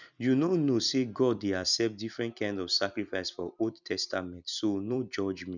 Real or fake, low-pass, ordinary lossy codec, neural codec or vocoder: fake; 7.2 kHz; none; vocoder, 44.1 kHz, 128 mel bands every 256 samples, BigVGAN v2